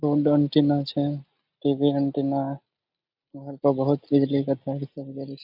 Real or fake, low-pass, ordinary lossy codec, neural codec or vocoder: real; 5.4 kHz; AAC, 48 kbps; none